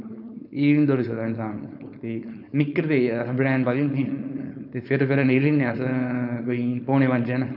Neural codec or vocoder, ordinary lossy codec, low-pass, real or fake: codec, 16 kHz, 4.8 kbps, FACodec; none; 5.4 kHz; fake